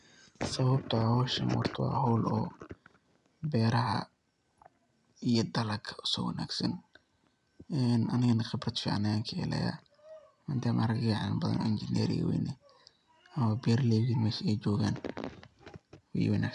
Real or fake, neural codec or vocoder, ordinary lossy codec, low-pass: real; none; none; 10.8 kHz